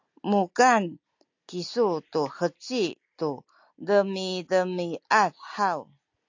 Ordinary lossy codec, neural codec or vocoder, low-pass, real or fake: AAC, 48 kbps; none; 7.2 kHz; real